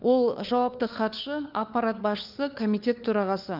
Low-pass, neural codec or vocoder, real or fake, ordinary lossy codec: 5.4 kHz; codec, 16 kHz, 4 kbps, FunCodec, trained on LibriTTS, 50 frames a second; fake; none